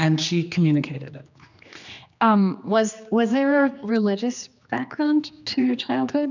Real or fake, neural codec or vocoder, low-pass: fake; codec, 16 kHz, 2 kbps, X-Codec, HuBERT features, trained on general audio; 7.2 kHz